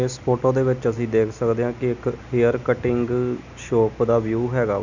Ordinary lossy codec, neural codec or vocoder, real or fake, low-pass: none; none; real; 7.2 kHz